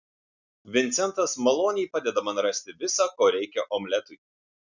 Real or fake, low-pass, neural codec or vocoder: real; 7.2 kHz; none